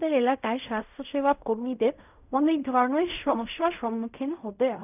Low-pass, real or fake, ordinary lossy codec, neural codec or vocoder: 3.6 kHz; fake; none; codec, 16 kHz in and 24 kHz out, 0.4 kbps, LongCat-Audio-Codec, fine tuned four codebook decoder